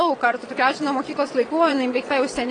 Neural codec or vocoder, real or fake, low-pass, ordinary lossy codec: vocoder, 22.05 kHz, 80 mel bands, Vocos; fake; 9.9 kHz; AAC, 32 kbps